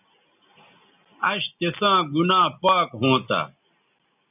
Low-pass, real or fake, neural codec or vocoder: 3.6 kHz; real; none